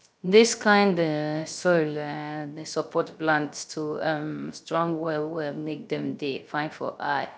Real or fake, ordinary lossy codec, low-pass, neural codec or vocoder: fake; none; none; codec, 16 kHz, 0.3 kbps, FocalCodec